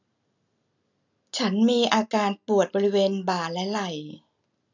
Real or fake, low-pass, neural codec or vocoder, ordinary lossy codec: real; 7.2 kHz; none; none